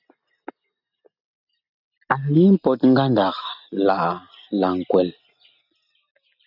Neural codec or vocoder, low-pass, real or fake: none; 5.4 kHz; real